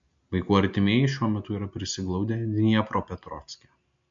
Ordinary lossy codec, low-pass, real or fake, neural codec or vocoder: MP3, 48 kbps; 7.2 kHz; real; none